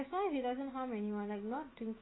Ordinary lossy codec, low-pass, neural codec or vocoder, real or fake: AAC, 16 kbps; 7.2 kHz; autoencoder, 48 kHz, 128 numbers a frame, DAC-VAE, trained on Japanese speech; fake